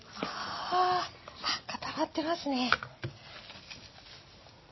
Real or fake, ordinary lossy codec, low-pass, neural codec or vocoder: real; MP3, 24 kbps; 7.2 kHz; none